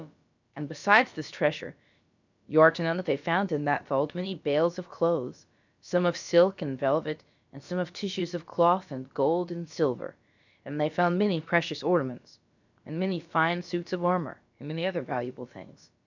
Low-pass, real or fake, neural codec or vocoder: 7.2 kHz; fake; codec, 16 kHz, about 1 kbps, DyCAST, with the encoder's durations